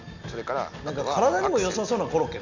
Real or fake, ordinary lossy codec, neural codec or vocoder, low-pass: real; none; none; 7.2 kHz